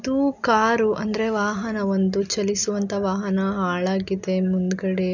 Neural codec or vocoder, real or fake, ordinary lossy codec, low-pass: none; real; none; 7.2 kHz